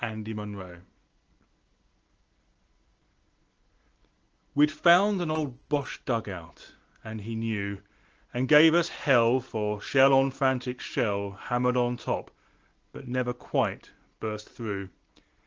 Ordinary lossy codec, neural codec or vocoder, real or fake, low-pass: Opus, 16 kbps; none; real; 7.2 kHz